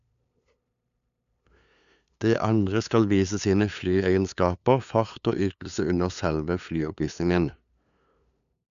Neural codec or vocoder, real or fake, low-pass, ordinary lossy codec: codec, 16 kHz, 2 kbps, FunCodec, trained on LibriTTS, 25 frames a second; fake; 7.2 kHz; none